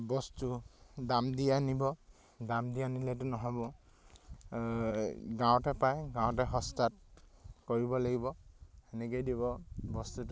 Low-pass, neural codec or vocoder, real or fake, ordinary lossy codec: none; none; real; none